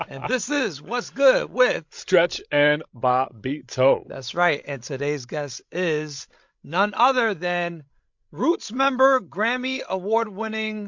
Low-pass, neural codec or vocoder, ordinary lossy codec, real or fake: 7.2 kHz; codec, 16 kHz, 16 kbps, FreqCodec, larger model; MP3, 48 kbps; fake